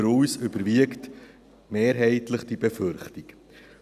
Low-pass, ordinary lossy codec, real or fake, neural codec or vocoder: 14.4 kHz; none; real; none